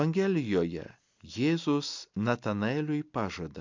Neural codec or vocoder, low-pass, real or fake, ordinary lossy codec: none; 7.2 kHz; real; MP3, 64 kbps